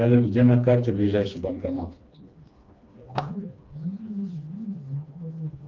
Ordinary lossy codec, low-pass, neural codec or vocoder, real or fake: Opus, 24 kbps; 7.2 kHz; codec, 16 kHz, 2 kbps, FreqCodec, smaller model; fake